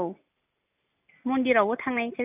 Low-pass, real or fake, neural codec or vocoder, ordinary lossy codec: 3.6 kHz; real; none; none